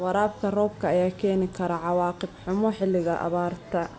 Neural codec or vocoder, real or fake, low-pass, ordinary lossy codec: none; real; none; none